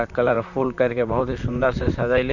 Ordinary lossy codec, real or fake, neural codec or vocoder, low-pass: none; fake; vocoder, 44.1 kHz, 128 mel bands, Pupu-Vocoder; 7.2 kHz